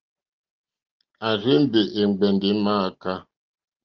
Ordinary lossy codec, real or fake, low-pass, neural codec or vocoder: Opus, 32 kbps; real; 7.2 kHz; none